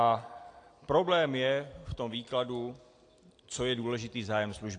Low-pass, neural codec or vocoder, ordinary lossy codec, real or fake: 9.9 kHz; none; AAC, 48 kbps; real